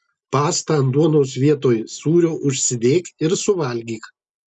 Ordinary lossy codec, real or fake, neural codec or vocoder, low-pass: MP3, 96 kbps; real; none; 10.8 kHz